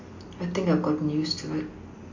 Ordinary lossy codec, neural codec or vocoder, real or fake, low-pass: MP3, 48 kbps; none; real; 7.2 kHz